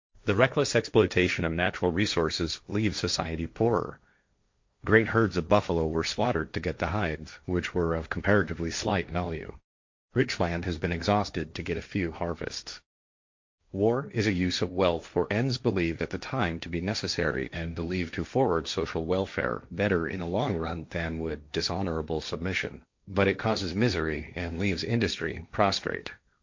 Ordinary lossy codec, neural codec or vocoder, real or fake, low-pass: MP3, 48 kbps; codec, 16 kHz, 1.1 kbps, Voila-Tokenizer; fake; 7.2 kHz